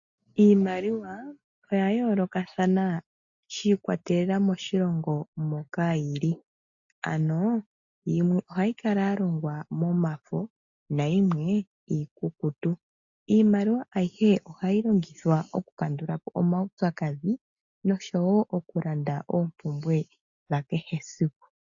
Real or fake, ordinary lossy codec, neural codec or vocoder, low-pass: real; AAC, 64 kbps; none; 7.2 kHz